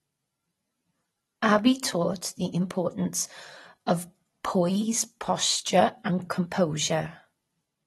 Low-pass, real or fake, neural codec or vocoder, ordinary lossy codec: 19.8 kHz; real; none; AAC, 32 kbps